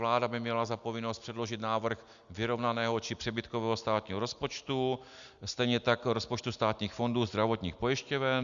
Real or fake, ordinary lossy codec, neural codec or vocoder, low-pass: real; Opus, 64 kbps; none; 7.2 kHz